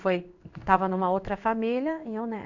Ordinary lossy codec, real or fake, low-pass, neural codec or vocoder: none; fake; 7.2 kHz; codec, 16 kHz in and 24 kHz out, 1 kbps, XY-Tokenizer